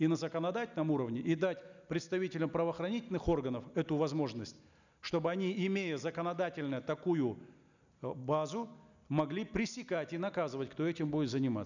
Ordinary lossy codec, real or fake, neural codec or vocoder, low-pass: none; real; none; 7.2 kHz